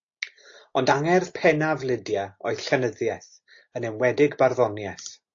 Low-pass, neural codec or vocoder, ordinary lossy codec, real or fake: 7.2 kHz; none; AAC, 48 kbps; real